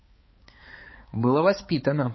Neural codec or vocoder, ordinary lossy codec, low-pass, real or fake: codec, 16 kHz, 4 kbps, X-Codec, HuBERT features, trained on balanced general audio; MP3, 24 kbps; 7.2 kHz; fake